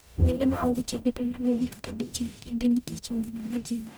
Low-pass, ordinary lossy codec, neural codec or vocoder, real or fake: none; none; codec, 44.1 kHz, 0.9 kbps, DAC; fake